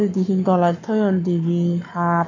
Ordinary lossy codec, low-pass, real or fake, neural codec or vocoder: none; 7.2 kHz; fake; codec, 44.1 kHz, 7.8 kbps, Pupu-Codec